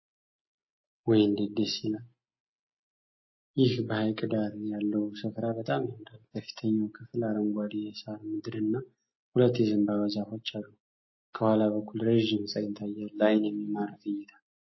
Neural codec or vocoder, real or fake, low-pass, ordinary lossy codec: none; real; 7.2 kHz; MP3, 24 kbps